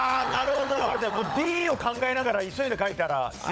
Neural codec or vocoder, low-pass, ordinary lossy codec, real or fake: codec, 16 kHz, 16 kbps, FunCodec, trained on LibriTTS, 50 frames a second; none; none; fake